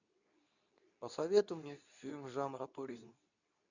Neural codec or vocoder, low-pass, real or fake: codec, 24 kHz, 0.9 kbps, WavTokenizer, medium speech release version 2; 7.2 kHz; fake